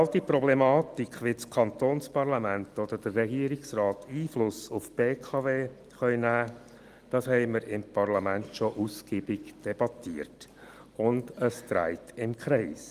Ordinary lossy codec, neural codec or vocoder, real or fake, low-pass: Opus, 24 kbps; none; real; 14.4 kHz